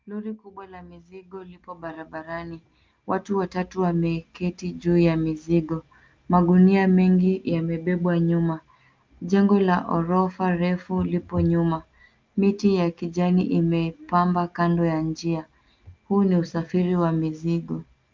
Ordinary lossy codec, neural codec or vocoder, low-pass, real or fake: Opus, 32 kbps; none; 7.2 kHz; real